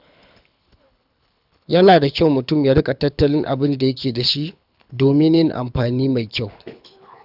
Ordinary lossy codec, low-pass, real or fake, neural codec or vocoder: none; 5.4 kHz; fake; codec, 24 kHz, 6 kbps, HILCodec